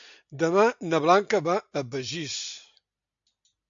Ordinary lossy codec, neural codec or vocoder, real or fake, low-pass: AAC, 64 kbps; none; real; 7.2 kHz